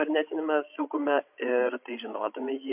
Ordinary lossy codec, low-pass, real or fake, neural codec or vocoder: MP3, 32 kbps; 3.6 kHz; fake; codec, 16 kHz, 16 kbps, FreqCodec, larger model